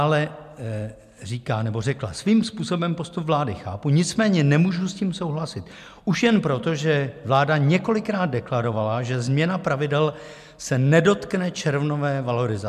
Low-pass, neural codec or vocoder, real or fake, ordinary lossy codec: 14.4 kHz; none; real; MP3, 96 kbps